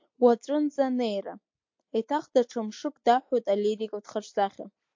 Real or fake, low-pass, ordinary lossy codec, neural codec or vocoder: real; 7.2 kHz; MP3, 48 kbps; none